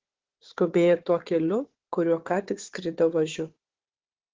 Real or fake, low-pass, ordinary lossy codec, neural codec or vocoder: fake; 7.2 kHz; Opus, 16 kbps; codec, 16 kHz, 4 kbps, FunCodec, trained on Chinese and English, 50 frames a second